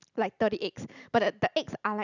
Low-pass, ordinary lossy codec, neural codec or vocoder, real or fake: 7.2 kHz; none; none; real